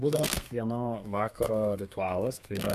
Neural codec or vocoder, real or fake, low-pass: codec, 32 kHz, 1.9 kbps, SNAC; fake; 14.4 kHz